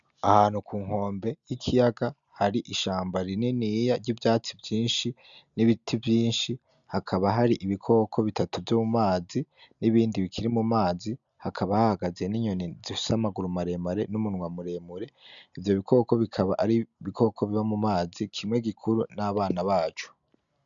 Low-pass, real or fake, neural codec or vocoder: 7.2 kHz; real; none